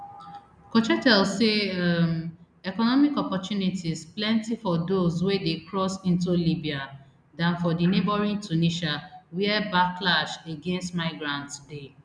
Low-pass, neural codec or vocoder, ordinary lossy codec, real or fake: 9.9 kHz; none; none; real